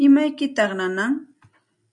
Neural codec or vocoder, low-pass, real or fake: none; 10.8 kHz; real